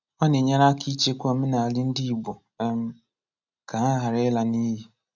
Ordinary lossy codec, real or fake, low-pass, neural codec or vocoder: none; real; 7.2 kHz; none